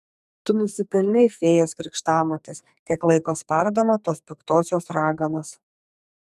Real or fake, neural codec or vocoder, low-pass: fake; codec, 44.1 kHz, 2.6 kbps, SNAC; 14.4 kHz